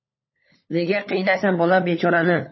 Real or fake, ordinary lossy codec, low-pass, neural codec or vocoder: fake; MP3, 24 kbps; 7.2 kHz; codec, 16 kHz, 16 kbps, FunCodec, trained on LibriTTS, 50 frames a second